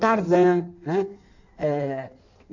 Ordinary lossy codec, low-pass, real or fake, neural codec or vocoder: none; 7.2 kHz; fake; codec, 16 kHz in and 24 kHz out, 1.1 kbps, FireRedTTS-2 codec